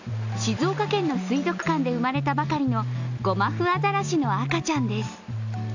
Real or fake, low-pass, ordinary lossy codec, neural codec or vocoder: real; 7.2 kHz; none; none